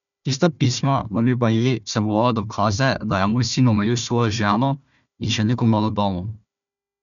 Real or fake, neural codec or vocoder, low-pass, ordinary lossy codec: fake; codec, 16 kHz, 1 kbps, FunCodec, trained on Chinese and English, 50 frames a second; 7.2 kHz; none